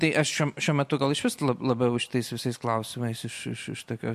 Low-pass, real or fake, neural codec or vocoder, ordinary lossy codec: 14.4 kHz; real; none; MP3, 64 kbps